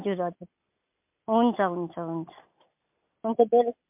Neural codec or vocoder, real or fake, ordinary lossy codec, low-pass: none; real; MP3, 32 kbps; 3.6 kHz